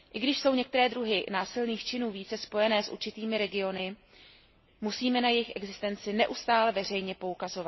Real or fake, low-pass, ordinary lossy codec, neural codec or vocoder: real; 7.2 kHz; MP3, 24 kbps; none